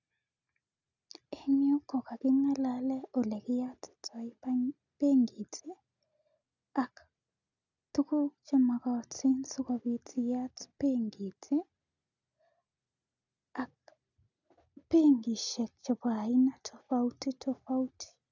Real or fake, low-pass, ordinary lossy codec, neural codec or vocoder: real; 7.2 kHz; none; none